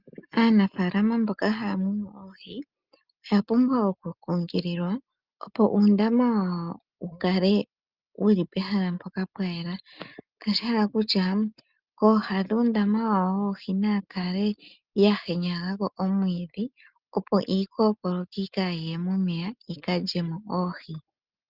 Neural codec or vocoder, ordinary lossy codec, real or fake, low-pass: none; Opus, 24 kbps; real; 5.4 kHz